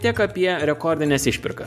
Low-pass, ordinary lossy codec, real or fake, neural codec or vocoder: 14.4 kHz; MP3, 96 kbps; real; none